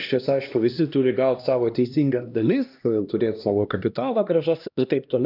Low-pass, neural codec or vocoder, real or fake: 5.4 kHz; codec, 16 kHz, 1 kbps, X-Codec, HuBERT features, trained on LibriSpeech; fake